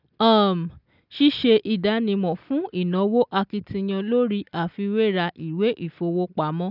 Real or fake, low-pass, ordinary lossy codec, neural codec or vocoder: real; 5.4 kHz; none; none